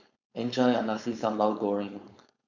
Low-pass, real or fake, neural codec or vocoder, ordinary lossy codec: 7.2 kHz; fake; codec, 16 kHz, 4.8 kbps, FACodec; none